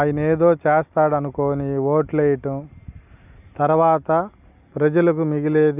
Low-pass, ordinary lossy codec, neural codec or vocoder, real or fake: 3.6 kHz; none; none; real